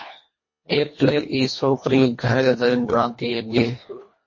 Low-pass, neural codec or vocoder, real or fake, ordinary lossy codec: 7.2 kHz; codec, 24 kHz, 1.5 kbps, HILCodec; fake; MP3, 32 kbps